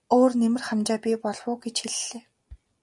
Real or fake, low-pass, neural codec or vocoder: real; 10.8 kHz; none